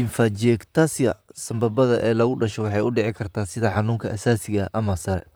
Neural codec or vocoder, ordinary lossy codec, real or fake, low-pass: vocoder, 44.1 kHz, 128 mel bands, Pupu-Vocoder; none; fake; none